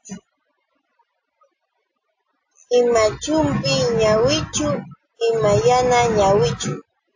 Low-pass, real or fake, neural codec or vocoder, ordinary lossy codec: 7.2 kHz; real; none; AAC, 48 kbps